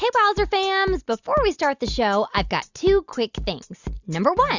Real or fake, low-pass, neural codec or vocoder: real; 7.2 kHz; none